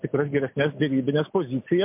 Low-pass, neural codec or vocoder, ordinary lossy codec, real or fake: 3.6 kHz; none; MP3, 32 kbps; real